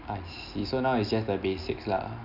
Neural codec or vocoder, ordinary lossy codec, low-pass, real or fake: none; none; 5.4 kHz; real